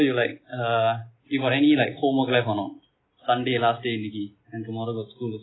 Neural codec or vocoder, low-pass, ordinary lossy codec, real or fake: none; 7.2 kHz; AAC, 16 kbps; real